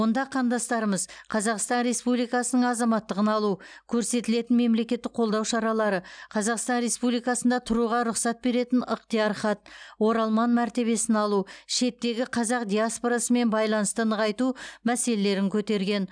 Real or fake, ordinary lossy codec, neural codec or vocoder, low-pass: real; none; none; 9.9 kHz